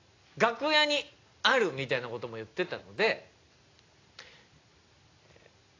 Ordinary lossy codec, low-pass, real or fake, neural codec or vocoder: AAC, 32 kbps; 7.2 kHz; real; none